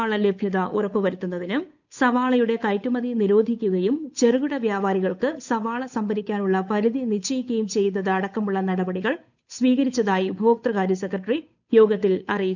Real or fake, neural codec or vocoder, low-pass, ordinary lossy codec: fake; codec, 16 kHz, 8 kbps, FunCodec, trained on Chinese and English, 25 frames a second; 7.2 kHz; none